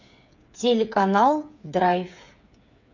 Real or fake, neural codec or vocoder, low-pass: fake; codec, 16 kHz, 8 kbps, FreqCodec, smaller model; 7.2 kHz